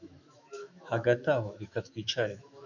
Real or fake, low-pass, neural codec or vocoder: fake; 7.2 kHz; autoencoder, 48 kHz, 128 numbers a frame, DAC-VAE, trained on Japanese speech